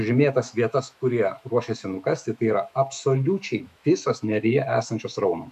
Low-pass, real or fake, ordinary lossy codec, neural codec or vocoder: 14.4 kHz; fake; MP3, 96 kbps; autoencoder, 48 kHz, 128 numbers a frame, DAC-VAE, trained on Japanese speech